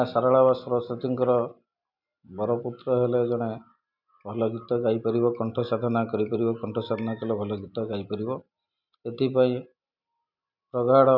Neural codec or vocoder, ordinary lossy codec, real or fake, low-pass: none; none; real; 5.4 kHz